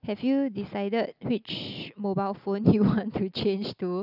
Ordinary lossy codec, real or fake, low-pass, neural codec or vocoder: none; real; 5.4 kHz; none